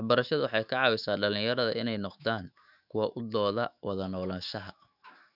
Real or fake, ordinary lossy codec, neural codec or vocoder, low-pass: fake; none; autoencoder, 48 kHz, 128 numbers a frame, DAC-VAE, trained on Japanese speech; 5.4 kHz